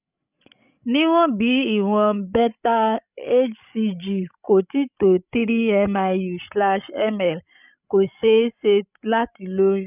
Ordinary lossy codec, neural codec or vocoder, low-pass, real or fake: none; codec, 16 kHz, 16 kbps, FreqCodec, larger model; 3.6 kHz; fake